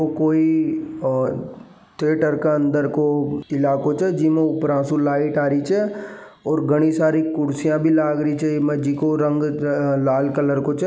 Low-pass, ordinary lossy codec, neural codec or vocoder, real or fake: none; none; none; real